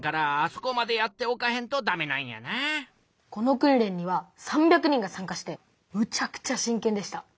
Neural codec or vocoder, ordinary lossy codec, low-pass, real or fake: none; none; none; real